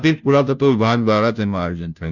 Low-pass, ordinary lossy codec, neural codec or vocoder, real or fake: 7.2 kHz; none; codec, 16 kHz, 0.5 kbps, FunCodec, trained on Chinese and English, 25 frames a second; fake